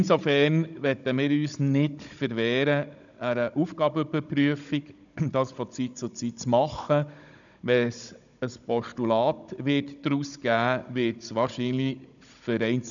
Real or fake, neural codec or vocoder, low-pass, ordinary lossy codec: fake; codec, 16 kHz, 16 kbps, FunCodec, trained on Chinese and English, 50 frames a second; 7.2 kHz; none